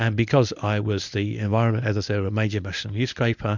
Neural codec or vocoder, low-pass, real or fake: codec, 24 kHz, 0.9 kbps, WavTokenizer, medium speech release version 1; 7.2 kHz; fake